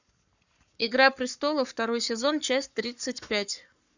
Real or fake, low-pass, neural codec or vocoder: fake; 7.2 kHz; codec, 44.1 kHz, 7.8 kbps, Pupu-Codec